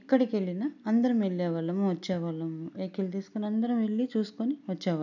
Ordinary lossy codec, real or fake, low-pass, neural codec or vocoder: none; real; 7.2 kHz; none